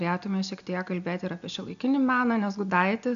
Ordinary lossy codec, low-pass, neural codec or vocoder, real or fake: MP3, 96 kbps; 7.2 kHz; none; real